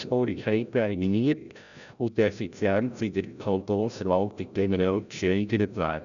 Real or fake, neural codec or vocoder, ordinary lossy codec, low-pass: fake; codec, 16 kHz, 0.5 kbps, FreqCodec, larger model; none; 7.2 kHz